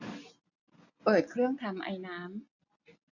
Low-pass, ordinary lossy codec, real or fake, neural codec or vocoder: 7.2 kHz; none; real; none